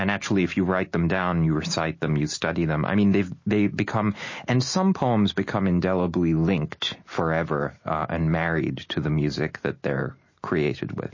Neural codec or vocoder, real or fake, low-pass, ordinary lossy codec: none; real; 7.2 kHz; MP3, 32 kbps